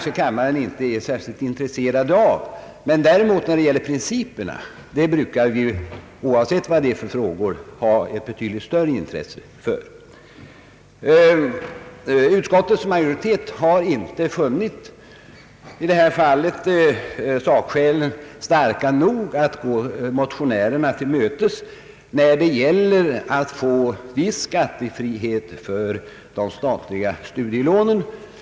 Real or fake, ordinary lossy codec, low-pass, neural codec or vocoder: real; none; none; none